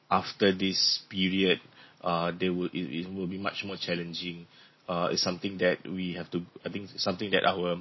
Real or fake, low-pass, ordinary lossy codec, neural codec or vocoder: real; 7.2 kHz; MP3, 24 kbps; none